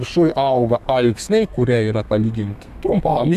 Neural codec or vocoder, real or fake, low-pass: codec, 32 kHz, 1.9 kbps, SNAC; fake; 14.4 kHz